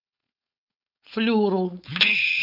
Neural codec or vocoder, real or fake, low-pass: codec, 16 kHz, 4.8 kbps, FACodec; fake; 5.4 kHz